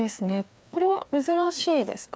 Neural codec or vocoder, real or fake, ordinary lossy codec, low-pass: codec, 16 kHz, 2 kbps, FreqCodec, larger model; fake; none; none